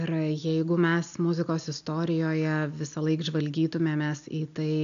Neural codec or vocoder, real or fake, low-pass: none; real; 7.2 kHz